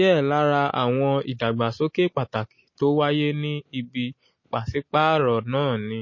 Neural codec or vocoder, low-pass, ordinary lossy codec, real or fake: none; 7.2 kHz; MP3, 32 kbps; real